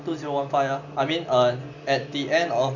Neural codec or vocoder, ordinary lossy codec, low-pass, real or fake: none; none; 7.2 kHz; real